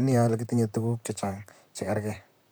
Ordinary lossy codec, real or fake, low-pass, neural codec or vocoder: none; fake; none; vocoder, 44.1 kHz, 128 mel bands, Pupu-Vocoder